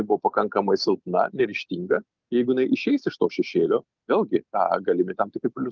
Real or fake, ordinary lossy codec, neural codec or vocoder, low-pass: real; Opus, 24 kbps; none; 7.2 kHz